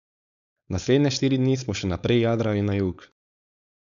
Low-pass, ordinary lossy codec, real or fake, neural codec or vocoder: 7.2 kHz; none; fake; codec, 16 kHz, 4.8 kbps, FACodec